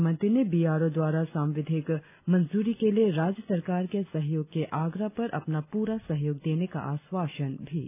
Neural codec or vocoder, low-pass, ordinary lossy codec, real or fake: none; 3.6 kHz; none; real